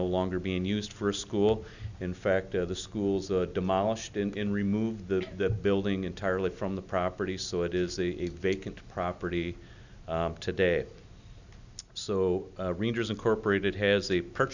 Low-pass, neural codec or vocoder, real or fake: 7.2 kHz; none; real